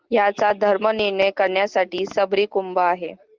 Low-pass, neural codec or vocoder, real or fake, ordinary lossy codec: 7.2 kHz; none; real; Opus, 32 kbps